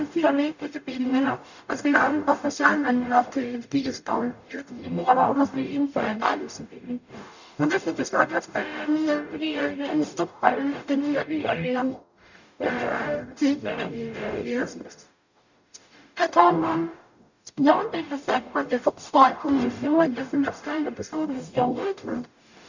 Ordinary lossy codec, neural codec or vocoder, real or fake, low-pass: none; codec, 44.1 kHz, 0.9 kbps, DAC; fake; 7.2 kHz